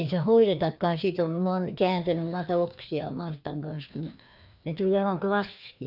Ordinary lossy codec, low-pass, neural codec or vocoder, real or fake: none; 5.4 kHz; codec, 16 kHz, 2 kbps, FreqCodec, larger model; fake